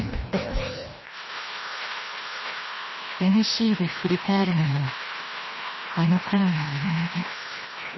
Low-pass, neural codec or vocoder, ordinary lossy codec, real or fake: 7.2 kHz; codec, 16 kHz, 1 kbps, FreqCodec, larger model; MP3, 24 kbps; fake